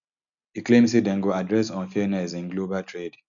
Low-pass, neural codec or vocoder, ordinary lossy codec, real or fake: 7.2 kHz; none; none; real